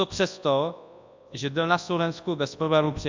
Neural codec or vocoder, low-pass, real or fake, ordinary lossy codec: codec, 24 kHz, 0.9 kbps, WavTokenizer, large speech release; 7.2 kHz; fake; MP3, 64 kbps